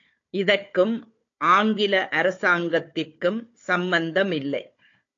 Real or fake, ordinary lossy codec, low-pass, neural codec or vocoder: fake; AAC, 48 kbps; 7.2 kHz; codec, 16 kHz, 4 kbps, FunCodec, trained on Chinese and English, 50 frames a second